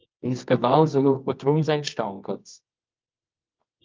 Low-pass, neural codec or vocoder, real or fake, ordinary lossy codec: 7.2 kHz; codec, 24 kHz, 0.9 kbps, WavTokenizer, medium music audio release; fake; Opus, 24 kbps